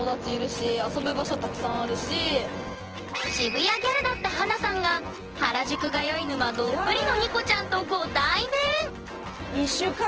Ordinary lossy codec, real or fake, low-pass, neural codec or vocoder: Opus, 16 kbps; fake; 7.2 kHz; vocoder, 24 kHz, 100 mel bands, Vocos